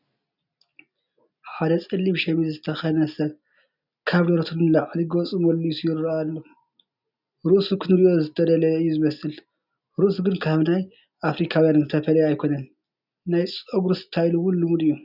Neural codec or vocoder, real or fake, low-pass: none; real; 5.4 kHz